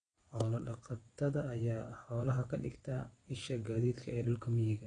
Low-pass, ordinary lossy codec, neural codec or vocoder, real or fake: 9.9 kHz; AAC, 32 kbps; vocoder, 22.05 kHz, 80 mel bands, WaveNeXt; fake